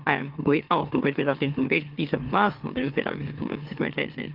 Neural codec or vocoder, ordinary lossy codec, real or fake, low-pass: autoencoder, 44.1 kHz, a latent of 192 numbers a frame, MeloTTS; Opus, 32 kbps; fake; 5.4 kHz